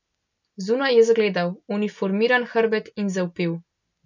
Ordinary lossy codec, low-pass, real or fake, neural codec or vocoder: none; 7.2 kHz; real; none